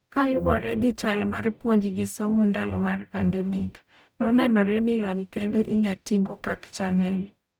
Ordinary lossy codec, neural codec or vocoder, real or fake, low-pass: none; codec, 44.1 kHz, 0.9 kbps, DAC; fake; none